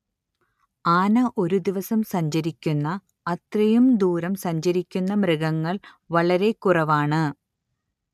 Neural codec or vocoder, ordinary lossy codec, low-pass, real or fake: none; MP3, 96 kbps; 14.4 kHz; real